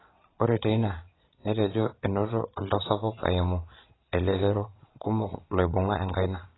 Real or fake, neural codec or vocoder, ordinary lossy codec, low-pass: fake; vocoder, 22.05 kHz, 80 mel bands, Vocos; AAC, 16 kbps; 7.2 kHz